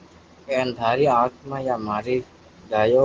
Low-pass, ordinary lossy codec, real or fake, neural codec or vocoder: 7.2 kHz; Opus, 16 kbps; real; none